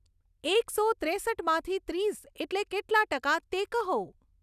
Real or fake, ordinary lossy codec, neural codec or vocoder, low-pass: real; none; none; 14.4 kHz